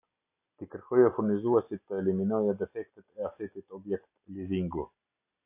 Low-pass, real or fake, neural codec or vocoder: 3.6 kHz; real; none